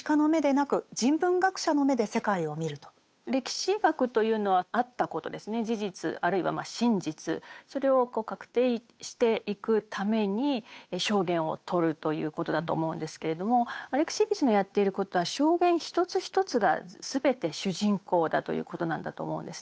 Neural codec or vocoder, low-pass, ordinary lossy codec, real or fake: codec, 16 kHz, 8 kbps, FunCodec, trained on Chinese and English, 25 frames a second; none; none; fake